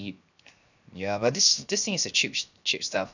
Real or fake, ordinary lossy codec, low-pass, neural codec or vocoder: fake; none; 7.2 kHz; codec, 16 kHz, 0.7 kbps, FocalCodec